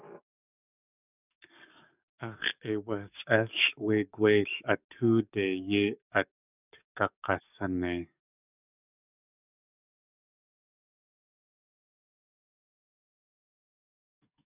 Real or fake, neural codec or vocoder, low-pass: fake; codec, 44.1 kHz, 7.8 kbps, DAC; 3.6 kHz